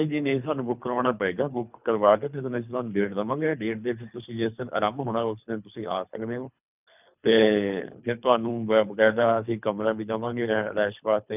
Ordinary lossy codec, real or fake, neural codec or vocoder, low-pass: none; fake; codec, 24 kHz, 3 kbps, HILCodec; 3.6 kHz